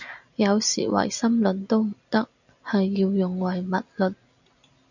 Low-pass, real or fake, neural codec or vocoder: 7.2 kHz; real; none